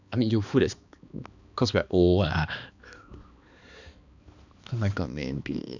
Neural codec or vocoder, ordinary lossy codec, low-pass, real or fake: codec, 16 kHz, 2 kbps, X-Codec, HuBERT features, trained on balanced general audio; none; 7.2 kHz; fake